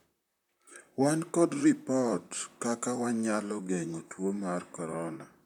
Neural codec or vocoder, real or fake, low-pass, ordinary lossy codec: vocoder, 44.1 kHz, 128 mel bands, Pupu-Vocoder; fake; 19.8 kHz; none